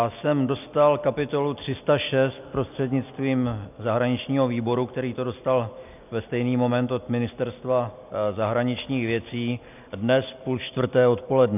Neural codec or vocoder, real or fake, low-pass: none; real; 3.6 kHz